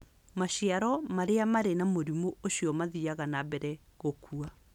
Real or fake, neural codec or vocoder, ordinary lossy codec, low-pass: real; none; none; 19.8 kHz